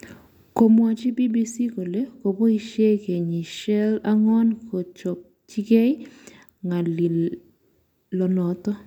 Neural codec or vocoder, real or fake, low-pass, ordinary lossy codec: none; real; 19.8 kHz; none